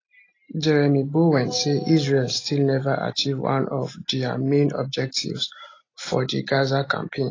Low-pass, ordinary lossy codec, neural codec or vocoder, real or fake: 7.2 kHz; AAC, 32 kbps; none; real